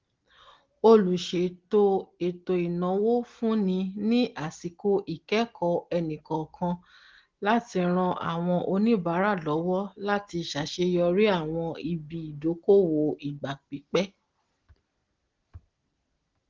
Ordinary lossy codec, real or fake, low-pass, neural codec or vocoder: Opus, 16 kbps; real; 7.2 kHz; none